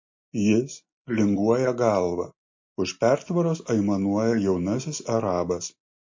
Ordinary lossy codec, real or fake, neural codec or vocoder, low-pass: MP3, 32 kbps; real; none; 7.2 kHz